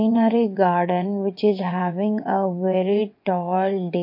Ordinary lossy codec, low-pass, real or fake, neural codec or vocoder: MP3, 32 kbps; 5.4 kHz; fake; vocoder, 22.05 kHz, 80 mel bands, WaveNeXt